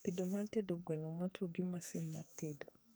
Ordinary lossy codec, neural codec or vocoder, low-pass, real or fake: none; codec, 44.1 kHz, 2.6 kbps, SNAC; none; fake